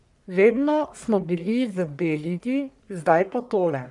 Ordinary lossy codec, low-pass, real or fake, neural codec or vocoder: none; 10.8 kHz; fake; codec, 44.1 kHz, 1.7 kbps, Pupu-Codec